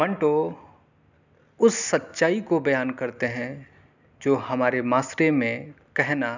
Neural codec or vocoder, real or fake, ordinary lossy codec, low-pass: none; real; none; 7.2 kHz